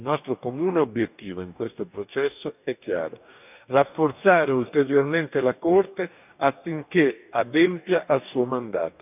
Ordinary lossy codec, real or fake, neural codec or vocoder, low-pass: none; fake; codec, 44.1 kHz, 2.6 kbps, DAC; 3.6 kHz